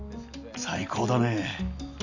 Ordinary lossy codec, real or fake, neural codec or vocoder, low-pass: none; real; none; 7.2 kHz